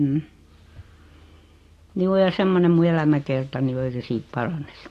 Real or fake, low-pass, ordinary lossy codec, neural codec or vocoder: real; 14.4 kHz; AAC, 48 kbps; none